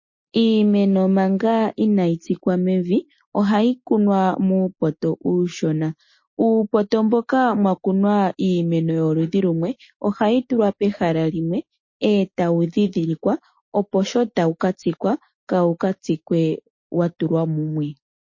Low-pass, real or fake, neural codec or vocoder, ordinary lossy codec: 7.2 kHz; real; none; MP3, 32 kbps